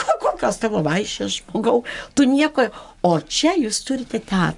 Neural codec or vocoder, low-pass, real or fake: codec, 44.1 kHz, 7.8 kbps, Pupu-Codec; 10.8 kHz; fake